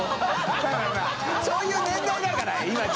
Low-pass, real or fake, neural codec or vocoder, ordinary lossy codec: none; real; none; none